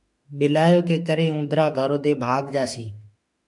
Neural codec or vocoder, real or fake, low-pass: autoencoder, 48 kHz, 32 numbers a frame, DAC-VAE, trained on Japanese speech; fake; 10.8 kHz